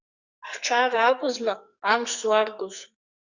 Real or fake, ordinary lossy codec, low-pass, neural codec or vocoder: fake; Opus, 64 kbps; 7.2 kHz; codec, 44.1 kHz, 2.6 kbps, SNAC